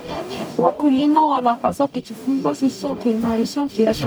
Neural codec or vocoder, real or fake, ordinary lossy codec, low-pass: codec, 44.1 kHz, 0.9 kbps, DAC; fake; none; none